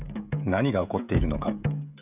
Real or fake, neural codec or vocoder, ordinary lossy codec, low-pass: fake; codec, 16 kHz, 16 kbps, FreqCodec, smaller model; none; 3.6 kHz